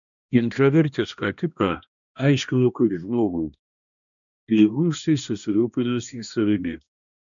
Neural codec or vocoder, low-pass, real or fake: codec, 16 kHz, 1 kbps, X-Codec, HuBERT features, trained on balanced general audio; 7.2 kHz; fake